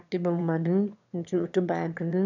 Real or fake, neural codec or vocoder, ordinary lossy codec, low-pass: fake; autoencoder, 22.05 kHz, a latent of 192 numbers a frame, VITS, trained on one speaker; none; 7.2 kHz